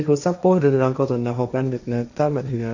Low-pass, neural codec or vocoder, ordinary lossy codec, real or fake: 7.2 kHz; codec, 16 kHz, 1.1 kbps, Voila-Tokenizer; none; fake